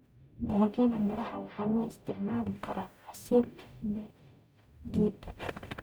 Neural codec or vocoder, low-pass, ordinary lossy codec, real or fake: codec, 44.1 kHz, 0.9 kbps, DAC; none; none; fake